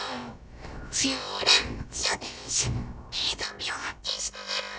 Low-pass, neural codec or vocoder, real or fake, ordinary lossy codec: none; codec, 16 kHz, about 1 kbps, DyCAST, with the encoder's durations; fake; none